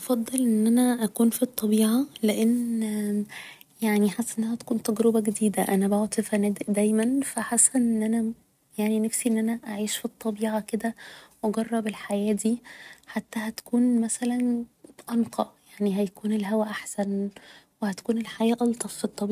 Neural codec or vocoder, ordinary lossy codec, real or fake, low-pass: none; none; real; 14.4 kHz